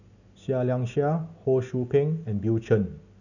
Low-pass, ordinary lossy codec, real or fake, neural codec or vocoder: 7.2 kHz; none; real; none